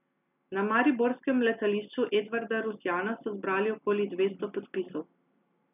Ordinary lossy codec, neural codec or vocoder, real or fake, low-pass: none; none; real; 3.6 kHz